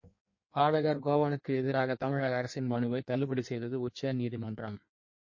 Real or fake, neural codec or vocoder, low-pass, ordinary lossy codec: fake; codec, 16 kHz in and 24 kHz out, 1.1 kbps, FireRedTTS-2 codec; 7.2 kHz; MP3, 32 kbps